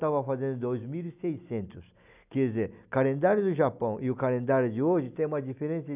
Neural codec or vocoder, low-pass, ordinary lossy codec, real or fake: none; 3.6 kHz; none; real